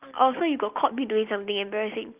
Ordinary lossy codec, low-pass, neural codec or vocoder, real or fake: Opus, 24 kbps; 3.6 kHz; none; real